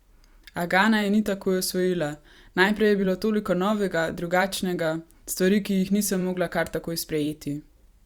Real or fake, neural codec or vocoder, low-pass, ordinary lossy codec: fake; vocoder, 44.1 kHz, 128 mel bands every 512 samples, BigVGAN v2; 19.8 kHz; Opus, 64 kbps